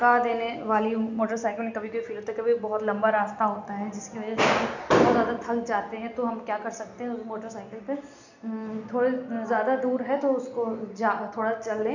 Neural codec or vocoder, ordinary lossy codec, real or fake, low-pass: none; none; real; 7.2 kHz